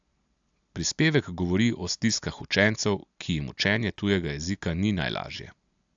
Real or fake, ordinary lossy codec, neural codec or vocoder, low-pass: real; AAC, 64 kbps; none; 7.2 kHz